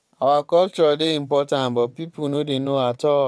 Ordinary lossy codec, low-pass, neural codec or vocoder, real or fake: none; none; vocoder, 22.05 kHz, 80 mel bands, WaveNeXt; fake